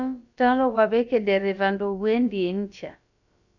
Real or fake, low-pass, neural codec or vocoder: fake; 7.2 kHz; codec, 16 kHz, about 1 kbps, DyCAST, with the encoder's durations